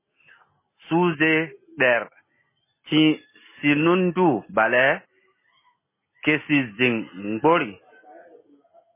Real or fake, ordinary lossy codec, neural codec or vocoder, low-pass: real; MP3, 16 kbps; none; 3.6 kHz